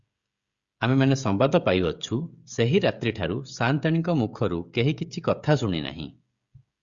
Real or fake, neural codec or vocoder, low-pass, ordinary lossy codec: fake; codec, 16 kHz, 16 kbps, FreqCodec, smaller model; 7.2 kHz; Opus, 64 kbps